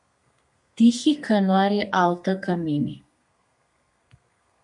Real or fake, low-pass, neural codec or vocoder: fake; 10.8 kHz; codec, 32 kHz, 1.9 kbps, SNAC